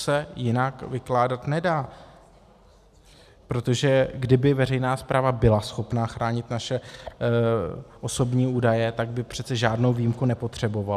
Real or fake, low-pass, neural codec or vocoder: real; 14.4 kHz; none